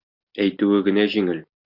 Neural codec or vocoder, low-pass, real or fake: none; 5.4 kHz; real